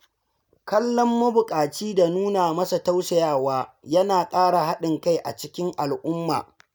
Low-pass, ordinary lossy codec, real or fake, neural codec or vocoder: none; none; real; none